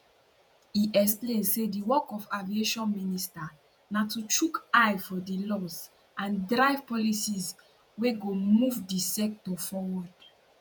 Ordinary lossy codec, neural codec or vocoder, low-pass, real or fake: none; vocoder, 48 kHz, 128 mel bands, Vocos; none; fake